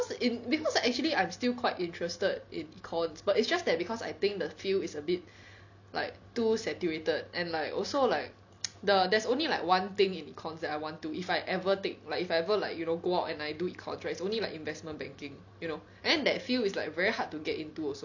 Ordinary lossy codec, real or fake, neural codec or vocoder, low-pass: none; real; none; 7.2 kHz